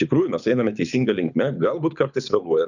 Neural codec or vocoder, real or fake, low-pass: codec, 24 kHz, 6 kbps, HILCodec; fake; 7.2 kHz